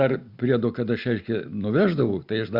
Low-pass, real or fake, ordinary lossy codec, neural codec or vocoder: 5.4 kHz; real; Opus, 64 kbps; none